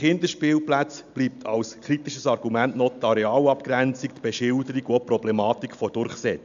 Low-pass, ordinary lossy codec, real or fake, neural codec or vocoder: 7.2 kHz; AAC, 96 kbps; real; none